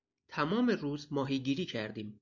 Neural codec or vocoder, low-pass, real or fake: none; 7.2 kHz; real